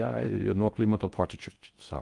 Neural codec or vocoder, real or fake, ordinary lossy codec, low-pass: codec, 16 kHz in and 24 kHz out, 0.6 kbps, FocalCodec, streaming, 4096 codes; fake; Opus, 32 kbps; 10.8 kHz